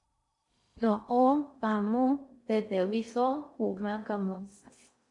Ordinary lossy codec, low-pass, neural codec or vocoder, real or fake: MP3, 64 kbps; 10.8 kHz; codec, 16 kHz in and 24 kHz out, 0.6 kbps, FocalCodec, streaming, 2048 codes; fake